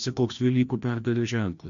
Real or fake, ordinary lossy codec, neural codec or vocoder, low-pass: fake; MP3, 64 kbps; codec, 16 kHz, 1 kbps, FreqCodec, larger model; 7.2 kHz